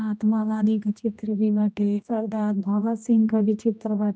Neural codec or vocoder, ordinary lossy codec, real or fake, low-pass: codec, 16 kHz, 1 kbps, X-Codec, HuBERT features, trained on general audio; none; fake; none